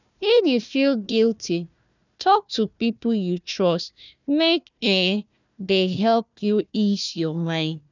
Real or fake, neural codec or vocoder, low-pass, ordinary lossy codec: fake; codec, 16 kHz, 1 kbps, FunCodec, trained on Chinese and English, 50 frames a second; 7.2 kHz; none